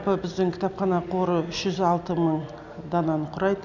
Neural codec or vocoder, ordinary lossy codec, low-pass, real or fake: none; none; 7.2 kHz; real